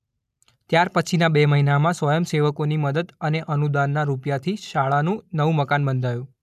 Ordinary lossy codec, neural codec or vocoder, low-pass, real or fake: none; none; 14.4 kHz; real